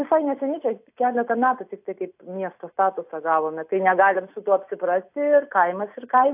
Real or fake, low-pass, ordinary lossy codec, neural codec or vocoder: real; 3.6 kHz; AAC, 32 kbps; none